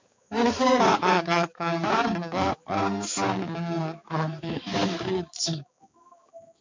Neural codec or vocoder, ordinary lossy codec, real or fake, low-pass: codec, 16 kHz, 4 kbps, X-Codec, HuBERT features, trained on balanced general audio; MP3, 64 kbps; fake; 7.2 kHz